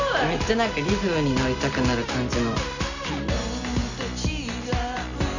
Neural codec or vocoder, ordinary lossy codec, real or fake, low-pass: none; none; real; 7.2 kHz